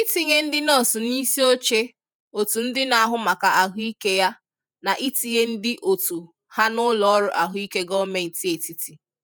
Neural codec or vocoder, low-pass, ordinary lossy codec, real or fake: vocoder, 48 kHz, 128 mel bands, Vocos; none; none; fake